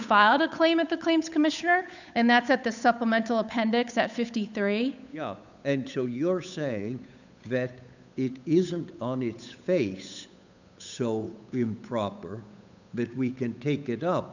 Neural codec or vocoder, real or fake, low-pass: codec, 16 kHz, 8 kbps, FunCodec, trained on Chinese and English, 25 frames a second; fake; 7.2 kHz